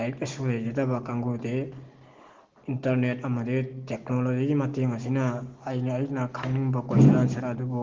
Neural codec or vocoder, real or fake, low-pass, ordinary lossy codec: none; real; 7.2 kHz; Opus, 16 kbps